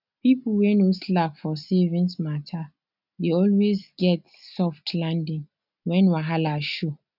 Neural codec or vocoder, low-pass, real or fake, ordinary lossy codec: none; 5.4 kHz; real; none